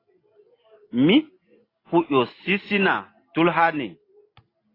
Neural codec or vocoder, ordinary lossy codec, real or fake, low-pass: none; AAC, 24 kbps; real; 5.4 kHz